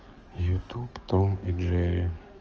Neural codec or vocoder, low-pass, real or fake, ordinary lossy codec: codec, 44.1 kHz, 7.8 kbps, DAC; 7.2 kHz; fake; Opus, 24 kbps